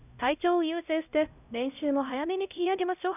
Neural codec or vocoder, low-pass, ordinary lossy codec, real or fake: codec, 16 kHz, 0.5 kbps, X-Codec, HuBERT features, trained on LibriSpeech; 3.6 kHz; none; fake